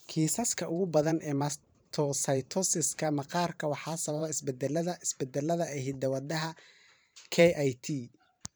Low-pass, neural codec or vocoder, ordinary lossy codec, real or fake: none; vocoder, 44.1 kHz, 128 mel bands every 512 samples, BigVGAN v2; none; fake